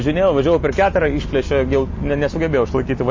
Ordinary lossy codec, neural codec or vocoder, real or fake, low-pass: MP3, 32 kbps; none; real; 7.2 kHz